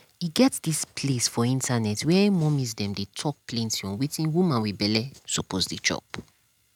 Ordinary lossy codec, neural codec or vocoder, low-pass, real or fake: none; none; 19.8 kHz; real